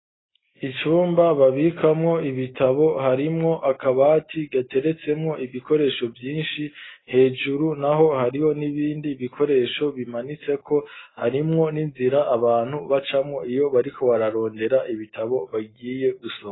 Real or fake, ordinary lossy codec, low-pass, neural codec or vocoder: real; AAC, 16 kbps; 7.2 kHz; none